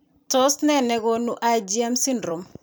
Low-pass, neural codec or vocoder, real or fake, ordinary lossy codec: none; vocoder, 44.1 kHz, 128 mel bands every 256 samples, BigVGAN v2; fake; none